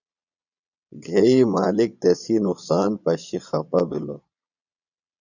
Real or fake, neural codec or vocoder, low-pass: fake; vocoder, 22.05 kHz, 80 mel bands, Vocos; 7.2 kHz